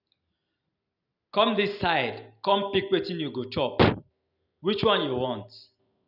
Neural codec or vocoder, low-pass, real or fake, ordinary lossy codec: none; 5.4 kHz; real; none